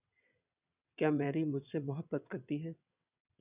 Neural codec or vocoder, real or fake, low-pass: vocoder, 22.05 kHz, 80 mel bands, WaveNeXt; fake; 3.6 kHz